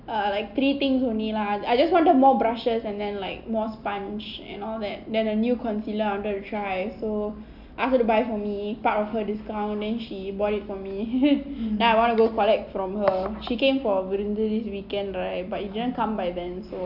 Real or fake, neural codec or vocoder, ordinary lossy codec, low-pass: real; none; AAC, 48 kbps; 5.4 kHz